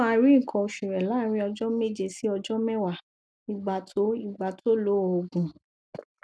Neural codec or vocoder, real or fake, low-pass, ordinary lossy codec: none; real; none; none